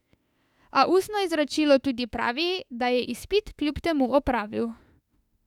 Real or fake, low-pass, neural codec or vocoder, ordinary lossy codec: fake; 19.8 kHz; autoencoder, 48 kHz, 32 numbers a frame, DAC-VAE, trained on Japanese speech; none